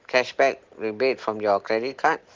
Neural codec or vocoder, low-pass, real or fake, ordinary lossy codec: none; 7.2 kHz; real; Opus, 16 kbps